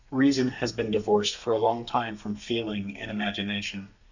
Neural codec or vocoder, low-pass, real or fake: codec, 32 kHz, 1.9 kbps, SNAC; 7.2 kHz; fake